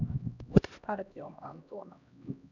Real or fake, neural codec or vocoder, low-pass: fake; codec, 16 kHz, 1 kbps, X-Codec, HuBERT features, trained on LibriSpeech; 7.2 kHz